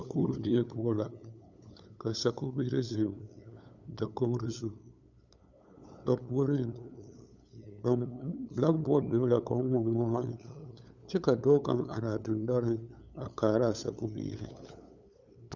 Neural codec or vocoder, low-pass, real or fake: codec, 16 kHz, 8 kbps, FunCodec, trained on LibriTTS, 25 frames a second; 7.2 kHz; fake